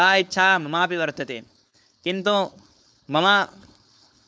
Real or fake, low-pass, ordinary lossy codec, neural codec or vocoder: fake; none; none; codec, 16 kHz, 4.8 kbps, FACodec